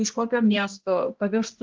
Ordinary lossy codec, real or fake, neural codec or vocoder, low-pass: Opus, 16 kbps; fake; codec, 16 kHz, 1 kbps, X-Codec, HuBERT features, trained on balanced general audio; 7.2 kHz